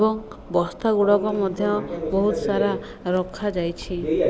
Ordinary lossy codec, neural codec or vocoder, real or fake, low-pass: none; none; real; none